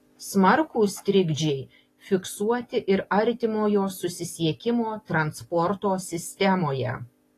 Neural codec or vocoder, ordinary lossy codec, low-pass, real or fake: none; AAC, 48 kbps; 14.4 kHz; real